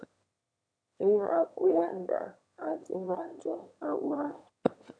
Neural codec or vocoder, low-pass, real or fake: autoencoder, 22.05 kHz, a latent of 192 numbers a frame, VITS, trained on one speaker; 9.9 kHz; fake